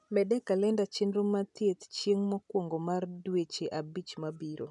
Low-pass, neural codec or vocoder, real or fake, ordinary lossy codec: 10.8 kHz; none; real; none